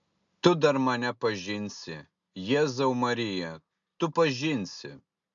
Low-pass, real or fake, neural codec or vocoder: 7.2 kHz; real; none